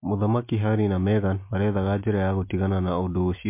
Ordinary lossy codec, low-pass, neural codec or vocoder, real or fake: MP3, 24 kbps; 3.6 kHz; none; real